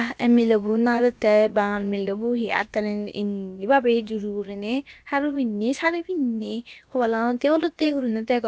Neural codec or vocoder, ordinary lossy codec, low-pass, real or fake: codec, 16 kHz, about 1 kbps, DyCAST, with the encoder's durations; none; none; fake